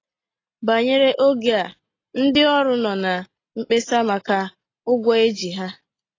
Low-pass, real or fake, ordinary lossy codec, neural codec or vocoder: 7.2 kHz; real; AAC, 32 kbps; none